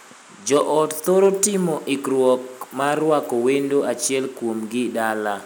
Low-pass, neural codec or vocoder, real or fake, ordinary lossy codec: none; none; real; none